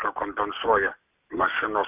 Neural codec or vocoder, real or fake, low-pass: none; real; 3.6 kHz